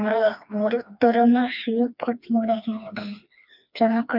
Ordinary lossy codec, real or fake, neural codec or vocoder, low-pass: none; fake; codec, 16 kHz, 2 kbps, FreqCodec, smaller model; 5.4 kHz